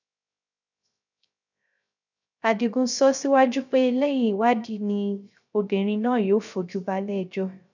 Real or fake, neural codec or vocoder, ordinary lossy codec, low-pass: fake; codec, 16 kHz, 0.3 kbps, FocalCodec; none; 7.2 kHz